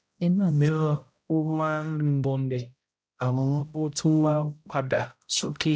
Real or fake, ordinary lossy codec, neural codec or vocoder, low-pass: fake; none; codec, 16 kHz, 0.5 kbps, X-Codec, HuBERT features, trained on balanced general audio; none